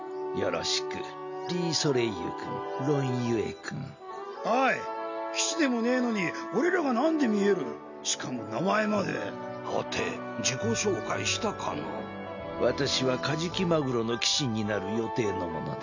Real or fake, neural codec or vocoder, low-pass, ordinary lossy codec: real; none; 7.2 kHz; none